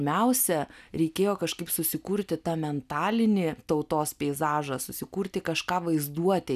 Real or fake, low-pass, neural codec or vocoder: real; 14.4 kHz; none